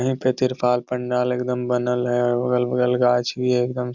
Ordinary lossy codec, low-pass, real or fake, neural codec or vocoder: none; 7.2 kHz; real; none